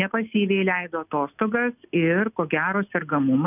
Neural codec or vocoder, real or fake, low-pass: none; real; 3.6 kHz